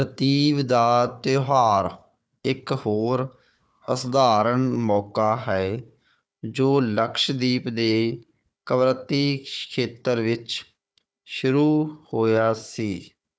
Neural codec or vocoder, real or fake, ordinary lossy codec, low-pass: codec, 16 kHz, 4 kbps, FunCodec, trained on Chinese and English, 50 frames a second; fake; none; none